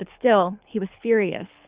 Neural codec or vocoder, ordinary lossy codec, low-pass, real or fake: codec, 24 kHz, 6 kbps, HILCodec; Opus, 64 kbps; 3.6 kHz; fake